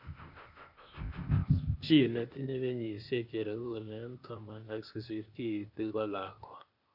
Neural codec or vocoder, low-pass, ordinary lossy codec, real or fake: codec, 16 kHz, 0.8 kbps, ZipCodec; 5.4 kHz; none; fake